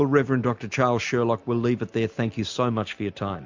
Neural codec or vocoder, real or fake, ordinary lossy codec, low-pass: none; real; MP3, 64 kbps; 7.2 kHz